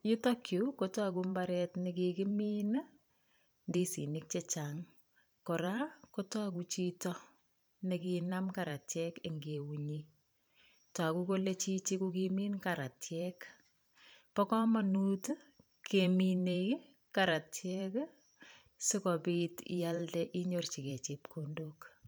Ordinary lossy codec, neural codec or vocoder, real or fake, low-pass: none; vocoder, 44.1 kHz, 128 mel bands every 512 samples, BigVGAN v2; fake; none